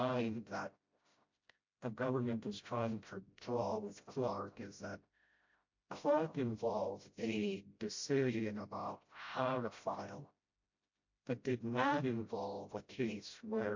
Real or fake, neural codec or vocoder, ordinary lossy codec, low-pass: fake; codec, 16 kHz, 0.5 kbps, FreqCodec, smaller model; MP3, 48 kbps; 7.2 kHz